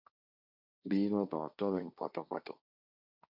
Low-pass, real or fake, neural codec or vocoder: 5.4 kHz; fake; codec, 16 kHz, 1.1 kbps, Voila-Tokenizer